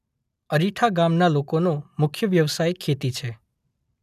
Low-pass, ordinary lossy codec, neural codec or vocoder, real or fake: 14.4 kHz; none; none; real